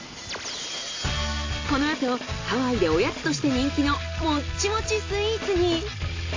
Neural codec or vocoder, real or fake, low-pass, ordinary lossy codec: none; real; 7.2 kHz; AAC, 32 kbps